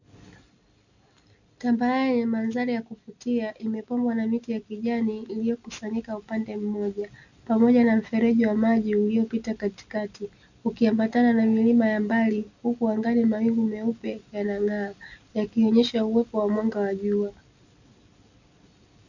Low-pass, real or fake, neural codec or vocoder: 7.2 kHz; real; none